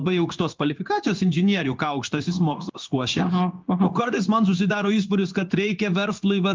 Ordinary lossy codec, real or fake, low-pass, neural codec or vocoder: Opus, 24 kbps; fake; 7.2 kHz; codec, 16 kHz in and 24 kHz out, 1 kbps, XY-Tokenizer